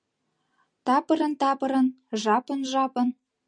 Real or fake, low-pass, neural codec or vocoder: real; 9.9 kHz; none